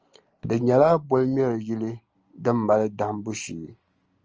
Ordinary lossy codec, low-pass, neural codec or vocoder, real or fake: Opus, 24 kbps; 7.2 kHz; none; real